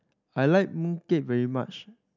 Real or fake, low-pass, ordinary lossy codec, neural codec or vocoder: real; 7.2 kHz; none; none